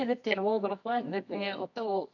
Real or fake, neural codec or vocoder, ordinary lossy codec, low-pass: fake; codec, 24 kHz, 0.9 kbps, WavTokenizer, medium music audio release; AAC, 48 kbps; 7.2 kHz